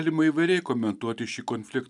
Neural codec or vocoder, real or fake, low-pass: none; real; 10.8 kHz